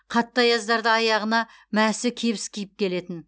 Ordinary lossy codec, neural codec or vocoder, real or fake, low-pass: none; none; real; none